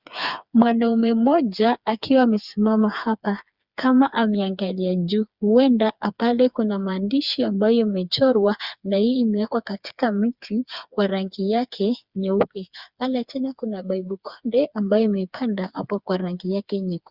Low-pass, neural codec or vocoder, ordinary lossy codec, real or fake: 5.4 kHz; codec, 16 kHz, 4 kbps, FreqCodec, smaller model; Opus, 64 kbps; fake